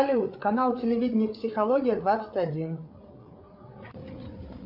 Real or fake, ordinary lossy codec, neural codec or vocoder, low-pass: fake; AAC, 48 kbps; codec, 16 kHz, 8 kbps, FreqCodec, larger model; 5.4 kHz